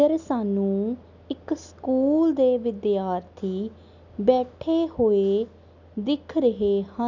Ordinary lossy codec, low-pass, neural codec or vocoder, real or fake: none; 7.2 kHz; none; real